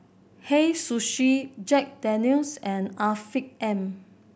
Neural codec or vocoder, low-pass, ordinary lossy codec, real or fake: none; none; none; real